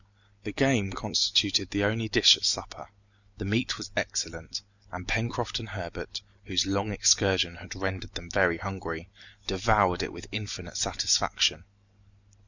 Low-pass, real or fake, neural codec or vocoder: 7.2 kHz; real; none